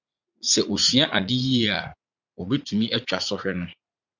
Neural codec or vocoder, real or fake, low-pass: vocoder, 44.1 kHz, 80 mel bands, Vocos; fake; 7.2 kHz